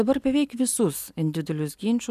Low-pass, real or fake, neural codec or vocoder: 14.4 kHz; real; none